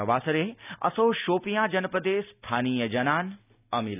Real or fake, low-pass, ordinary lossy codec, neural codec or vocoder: real; 3.6 kHz; none; none